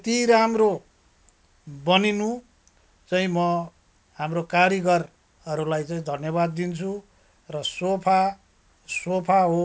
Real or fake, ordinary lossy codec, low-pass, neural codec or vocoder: real; none; none; none